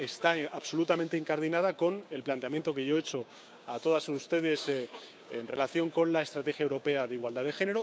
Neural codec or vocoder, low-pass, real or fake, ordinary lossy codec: codec, 16 kHz, 6 kbps, DAC; none; fake; none